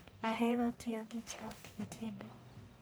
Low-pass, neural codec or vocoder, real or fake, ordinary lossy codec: none; codec, 44.1 kHz, 1.7 kbps, Pupu-Codec; fake; none